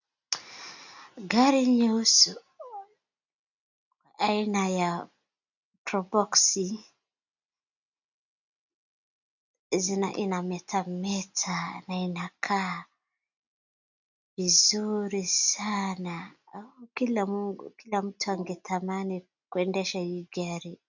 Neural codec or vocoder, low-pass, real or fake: none; 7.2 kHz; real